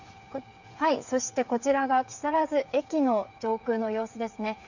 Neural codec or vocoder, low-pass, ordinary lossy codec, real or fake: codec, 16 kHz, 8 kbps, FreqCodec, smaller model; 7.2 kHz; none; fake